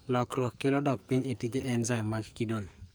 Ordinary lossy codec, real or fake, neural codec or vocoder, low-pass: none; fake; codec, 44.1 kHz, 2.6 kbps, SNAC; none